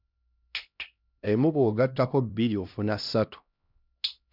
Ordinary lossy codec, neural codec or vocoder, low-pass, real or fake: none; codec, 16 kHz, 1 kbps, X-Codec, HuBERT features, trained on LibriSpeech; 5.4 kHz; fake